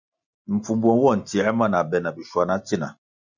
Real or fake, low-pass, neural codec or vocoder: real; 7.2 kHz; none